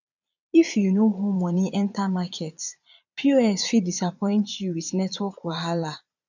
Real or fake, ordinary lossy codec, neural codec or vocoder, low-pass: fake; none; vocoder, 22.05 kHz, 80 mel bands, Vocos; 7.2 kHz